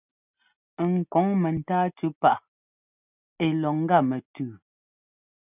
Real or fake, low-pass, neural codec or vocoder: real; 3.6 kHz; none